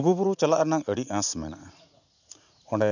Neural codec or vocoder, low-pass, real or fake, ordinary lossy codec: vocoder, 44.1 kHz, 80 mel bands, Vocos; 7.2 kHz; fake; none